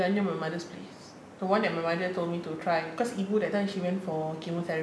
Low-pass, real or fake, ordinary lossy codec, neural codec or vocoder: none; real; none; none